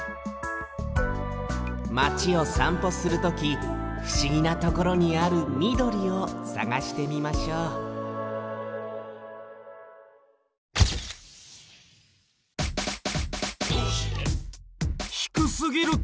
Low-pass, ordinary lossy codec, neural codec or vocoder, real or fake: none; none; none; real